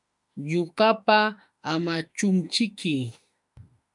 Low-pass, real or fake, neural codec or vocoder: 10.8 kHz; fake; autoencoder, 48 kHz, 32 numbers a frame, DAC-VAE, trained on Japanese speech